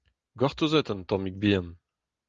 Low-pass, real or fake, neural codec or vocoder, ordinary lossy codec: 7.2 kHz; real; none; Opus, 32 kbps